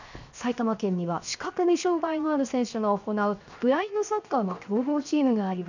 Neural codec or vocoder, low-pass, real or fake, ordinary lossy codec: codec, 16 kHz, 0.7 kbps, FocalCodec; 7.2 kHz; fake; none